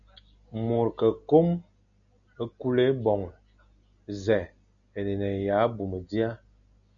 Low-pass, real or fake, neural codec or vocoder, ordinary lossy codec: 7.2 kHz; real; none; MP3, 96 kbps